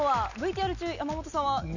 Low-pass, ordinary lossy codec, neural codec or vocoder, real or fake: 7.2 kHz; none; none; real